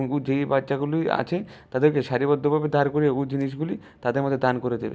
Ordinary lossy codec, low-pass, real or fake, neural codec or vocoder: none; none; real; none